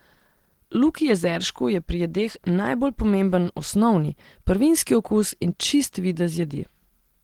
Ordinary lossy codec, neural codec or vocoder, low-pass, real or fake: Opus, 16 kbps; none; 19.8 kHz; real